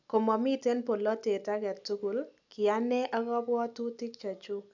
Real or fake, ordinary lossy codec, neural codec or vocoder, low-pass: real; none; none; 7.2 kHz